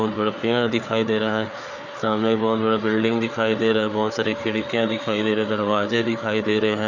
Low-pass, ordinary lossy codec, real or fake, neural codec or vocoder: 7.2 kHz; none; fake; codec, 16 kHz, 4 kbps, FreqCodec, larger model